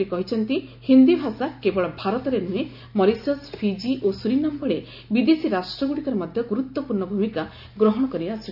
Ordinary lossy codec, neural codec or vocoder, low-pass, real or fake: AAC, 48 kbps; none; 5.4 kHz; real